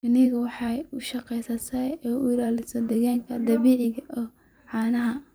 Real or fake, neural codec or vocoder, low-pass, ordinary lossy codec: fake; vocoder, 44.1 kHz, 128 mel bands every 512 samples, BigVGAN v2; none; none